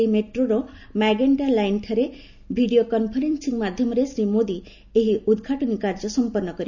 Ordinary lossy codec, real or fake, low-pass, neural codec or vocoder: none; real; 7.2 kHz; none